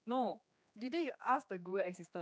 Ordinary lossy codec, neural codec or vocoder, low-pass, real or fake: none; codec, 16 kHz, 2 kbps, X-Codec, HuBERT features, trained on general audio; none; fake